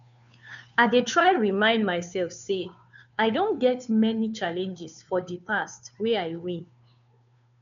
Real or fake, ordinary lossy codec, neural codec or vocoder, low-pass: fake; MP3, 96 kbps; codec, 16 kHz, 2 kbps, FunCodec, trained on Chinese and English, 25 frames a second; 7.2 kHz